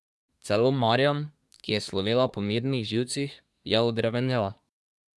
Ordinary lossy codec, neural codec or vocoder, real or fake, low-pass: none; codec, 24 kHz, 1 kbps, SNAC; fake; none